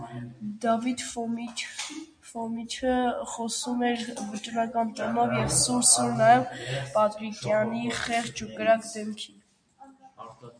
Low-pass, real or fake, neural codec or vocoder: 9.9 kHz; real; none